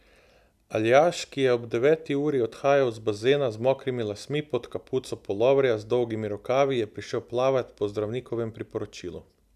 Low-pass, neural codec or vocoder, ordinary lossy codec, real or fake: 14.4 kHz; none; none; real